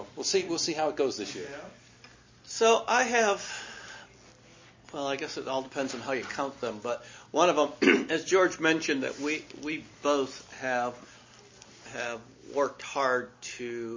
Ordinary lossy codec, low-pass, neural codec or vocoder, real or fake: MP3, 32 kbps; 7.2 kHz; none; real